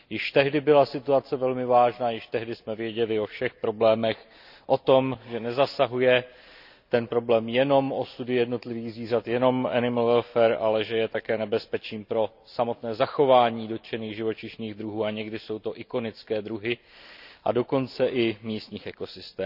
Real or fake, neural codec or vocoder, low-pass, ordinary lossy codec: real; none; 5.4 kHz; none